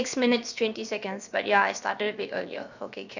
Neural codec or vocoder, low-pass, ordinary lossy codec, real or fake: codec, 16 kHz, 0.7 kbps, FocalCodec; 7.2 kHz; none; fake